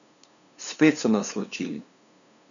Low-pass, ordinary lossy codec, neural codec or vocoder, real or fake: 7.2 kHz; none; codec, 16 kHz, 2 kbps, FunCodec, trained on LibriTTS, 25 frames a second; fake